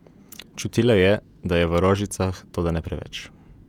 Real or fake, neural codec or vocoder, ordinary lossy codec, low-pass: fake; vocoder, 48 kHz, 128 mel bands, Vocos; none; 19.8 kHz